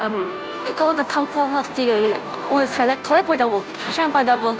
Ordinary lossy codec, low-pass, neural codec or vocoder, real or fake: none; none; codec, 16 kHz, 0.5 kbps, FunCodec, trained on Chinese and English, 25 frames a second; fake